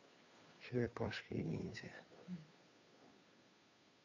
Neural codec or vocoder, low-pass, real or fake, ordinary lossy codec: codec, 16 kHz, 2 kbps, FunCodec, trained on Chinese and English, 25 frames a second; 7.2 kHz; fake; AAC, 48 kbps